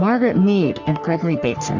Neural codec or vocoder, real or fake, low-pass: codec, 44.1 kHz, 3.4 kbps, Pupu-Codec; fake; 7.2 kHz